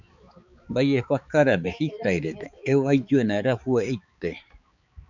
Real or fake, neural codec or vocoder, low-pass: fake; codec, 16 kHz, 4 kbps, X-Codec, HuBERT features, trained on balanced general audio; 7.2 kHz